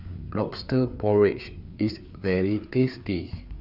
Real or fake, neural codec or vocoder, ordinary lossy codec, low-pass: fake; codec, 16 kHz, 4 kbps, FreqCodec, larger model; none; 5.4 kHz